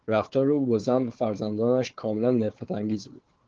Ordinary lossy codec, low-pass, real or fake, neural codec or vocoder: Opus, 16 kbps; 7.2 kHz; fake; codec, 16 kHz, 4 kbps, FunCodec, trained on Chinese and English, 50 frames a second